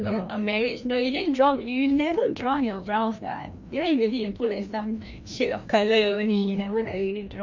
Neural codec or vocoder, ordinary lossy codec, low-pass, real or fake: codec, 16 kHz, 1 kbps, FreqCodec, larger model; none; 7.2 kHz; fake